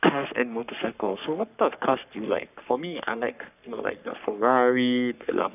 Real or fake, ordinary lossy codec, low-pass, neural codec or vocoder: fake; none; 3.6 kHz; codec, 44.1 kHz, 3.4 kbps, Pupu-Codec